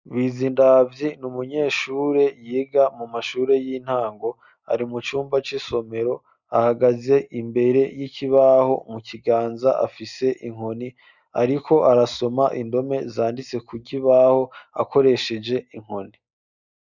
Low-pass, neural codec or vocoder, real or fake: 7.2 kHz; none; real